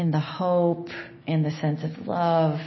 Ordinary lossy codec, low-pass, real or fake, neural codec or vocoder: MP3, 24 kbps; 7.2 kHz; real; none